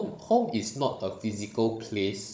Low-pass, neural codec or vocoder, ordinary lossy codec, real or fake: none; codec, 16 kHz, 16 kbps, FunCodec, trained on Chinese and English, 50 frames a second; none; fake